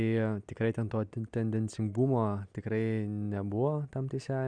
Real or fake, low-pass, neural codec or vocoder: real; 9.9 kHz; none